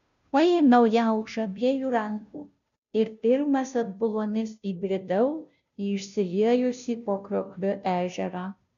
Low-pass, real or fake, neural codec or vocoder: 7.2 kHz; fake; codec, 16 kHz, 0.5 kbps, FunCodec, trained on Chinese and English, 25 frames a second